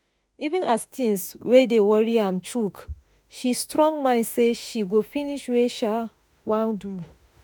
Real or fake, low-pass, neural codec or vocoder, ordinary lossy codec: fake; none; autoencoder, 48 kHz, 32 numbers a frame, DAC-VAE, trained on Japanese speech; none